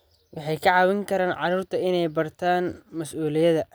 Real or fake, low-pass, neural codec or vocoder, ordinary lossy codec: real; none; none; none